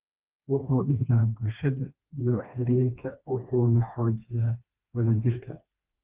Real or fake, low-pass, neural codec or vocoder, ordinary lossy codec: fake; 3.6 kHz; codec, 16 kHz, 2 kbps, FreqCodec, smaller model; Opus, 32 kbps